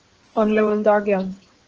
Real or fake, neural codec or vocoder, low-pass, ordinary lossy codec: fake; codec, 16 kHz in and 24 kHz out, 2.2 kbps, FireRedTTS-2 codec; 7.2 kHz; Opus, 16 kbps